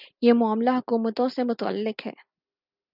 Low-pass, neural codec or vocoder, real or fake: 5.4 kHz; none; real